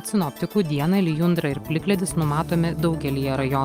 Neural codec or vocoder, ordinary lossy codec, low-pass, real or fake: none; Opus, 32 kbps; 19.8 kHz; real